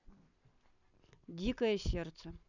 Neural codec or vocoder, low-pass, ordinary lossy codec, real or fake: none; 7.2 kHz; none; real